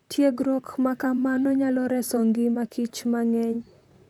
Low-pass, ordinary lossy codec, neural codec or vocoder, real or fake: 19.8 kHz; none; vocoder, 44.1 kHz, 128 mel bands, Pupu-Vocoder; fake